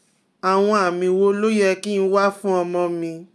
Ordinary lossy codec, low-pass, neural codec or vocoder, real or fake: none; none; none; real